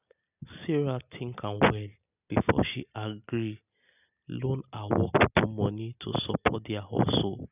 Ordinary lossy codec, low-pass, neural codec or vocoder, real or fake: none; 3.6 kHz; none; real